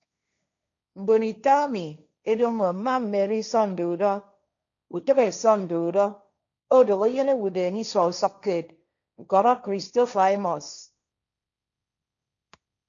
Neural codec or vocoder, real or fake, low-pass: codec, 16 kHz, 1.1 kbps, Voila-Tokenizer; fake; 7.2 kHz